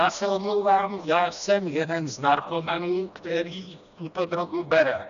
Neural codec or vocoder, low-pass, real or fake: codec, 16 kHz, 1 kbps, FreqCodec, smaller model; 7.2 kHz; fake